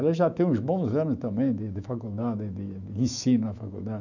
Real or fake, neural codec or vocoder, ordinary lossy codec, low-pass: fake; autoencoder, 48 kHz, 128 numbers a frame, DAC-VAE, trained on Japanese speech; none; 7.2 kHz